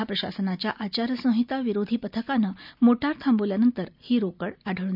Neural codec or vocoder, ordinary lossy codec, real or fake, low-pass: none; none; real; 5.4 kHz